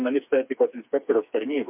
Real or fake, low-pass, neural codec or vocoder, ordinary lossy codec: fake; 3.6 kHz; codec, 16 kHz, 4 kbps, FreqCodec, smaller model; MP3, 32 kbps